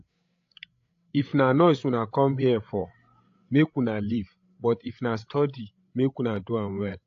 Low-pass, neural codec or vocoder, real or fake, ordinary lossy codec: 7.2 kHz; codec, 16 kHz, 8 kbps, FreqCodec, larger model; fake; AAC, 48 kbps